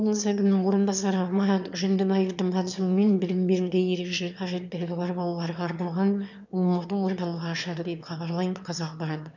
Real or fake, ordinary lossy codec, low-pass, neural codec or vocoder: fake; none; 7.2 kHz; autoencoder, 22.05 kHz, a latent of 192 numbers a frame, VITS, trained on one speaker